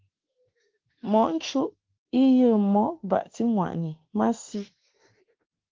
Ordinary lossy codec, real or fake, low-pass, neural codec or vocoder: Opus, 16 kbps; fake; 7.2 kHz; autoencoder, 48 kHz, 32 numbers a frame, DAC-VAE, trained on Japanese speech